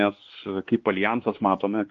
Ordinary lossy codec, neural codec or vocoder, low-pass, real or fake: Opus, 16 kbps; codec, 16 kHz, 2 kbps, X-Codec, WavLM features, trained on Multilingual LibriSpeech; 7.2 kHz; fake